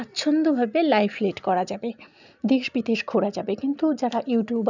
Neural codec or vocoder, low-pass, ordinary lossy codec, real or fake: none; 7.2 kHz; none; real